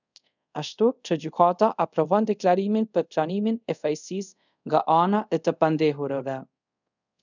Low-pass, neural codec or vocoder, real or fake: 7.2 kHz; codec, 24 kHz, 0.5 kbps, DualCodec; fake